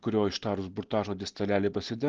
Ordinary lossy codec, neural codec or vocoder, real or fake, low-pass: Opus, 16 kbps; none; real; 7.2 kHz